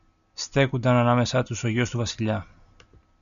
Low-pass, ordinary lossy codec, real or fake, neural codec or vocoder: 7.2 kHz; MP3, 96 kbps; real; none